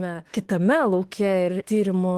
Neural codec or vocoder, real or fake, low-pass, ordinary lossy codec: autoencoder, 48 kHz, 32 numbers a frame, DAC-VAE, trained on Japanese speech; fake; 14.4 kHz; Opus, 16 kbps